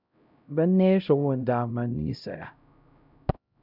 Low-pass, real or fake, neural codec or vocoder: 5.4 kHz; fake; codec, 16 kHz, 0.5 kbps, X-Codec, HuBERT features, trained on LibriSpeech